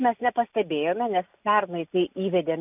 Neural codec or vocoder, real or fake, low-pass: none; real; 3.6 kHz